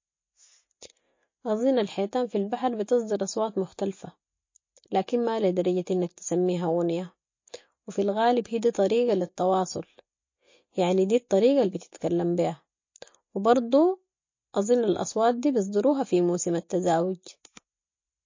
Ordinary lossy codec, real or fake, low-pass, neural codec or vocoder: MP3, 32 kbps; real; 7.2 kHz; none